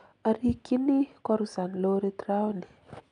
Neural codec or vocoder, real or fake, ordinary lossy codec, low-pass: none; real; none; 9.9 kHz